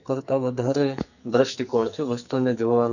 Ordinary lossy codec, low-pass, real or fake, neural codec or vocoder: none; 7.2 kHz; fake; codec, 44.1 kHz, 2.6 kbps, SNAC